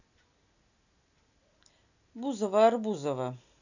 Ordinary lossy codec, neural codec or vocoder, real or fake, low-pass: none; none; real; 7.2 kHz